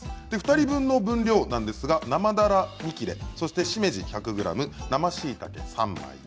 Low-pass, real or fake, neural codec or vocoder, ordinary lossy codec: none; real; none; none